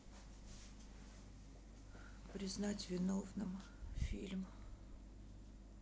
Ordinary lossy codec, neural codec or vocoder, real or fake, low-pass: none; none; real; none